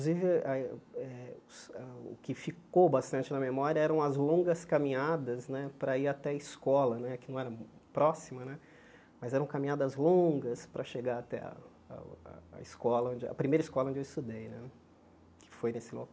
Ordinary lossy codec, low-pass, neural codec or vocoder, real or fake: none; none; none; real